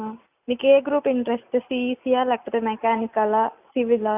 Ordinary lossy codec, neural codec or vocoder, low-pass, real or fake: none; none; 3.6 kHz; real